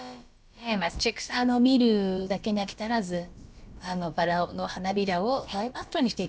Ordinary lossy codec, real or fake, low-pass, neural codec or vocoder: none; fake; none; codec, 16 kHz, about 1 kbps, DyCAST, with the encoder's durations